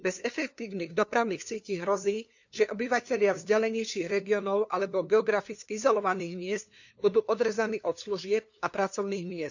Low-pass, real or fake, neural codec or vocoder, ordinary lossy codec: 7.2 kHz; fake; codec, 16 kHz, 2 kbps, FunCodec, trained on LibriTTS, 25 frames a second; none